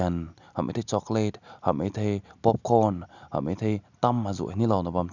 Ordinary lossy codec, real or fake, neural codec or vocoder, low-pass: none; real; none; 7.2 kHz